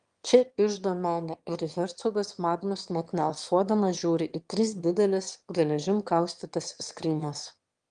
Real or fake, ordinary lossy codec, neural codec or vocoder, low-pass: fake; Opus, 24 kbps; autoencoder, 22.05 kHz, a latent of 192 numbers a frame, VITS, trained on one speaker; 9.9 kHz